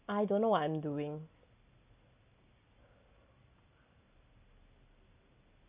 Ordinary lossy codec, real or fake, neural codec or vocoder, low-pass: none; real; none; 3.6 kHz